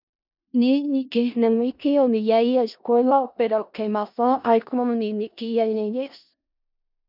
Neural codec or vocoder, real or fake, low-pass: codec, 16 kHz in and 24 kHz out, 0.4 kbps, LongCat-Audio-Codec, four codebook decoder; fake; 5.4 kHz